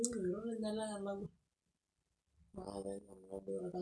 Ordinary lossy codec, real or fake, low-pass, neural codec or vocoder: none; real; none; none